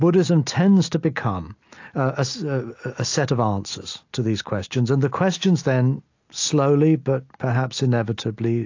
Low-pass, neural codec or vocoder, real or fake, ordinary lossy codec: 7.2 kHz; none; real; AAC, 48 kbps